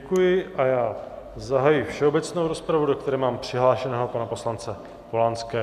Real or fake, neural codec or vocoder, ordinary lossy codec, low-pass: real; none; MP3, 96 kbps; 14.4 kHz